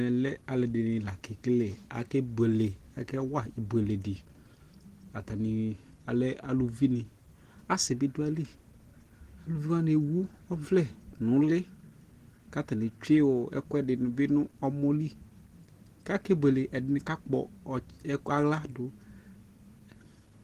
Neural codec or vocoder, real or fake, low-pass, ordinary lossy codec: none; real; 14.4 kHz; Opus, 16 kbps